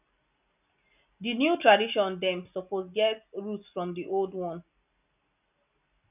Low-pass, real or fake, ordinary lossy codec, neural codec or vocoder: 3.6 kHz; real; none; none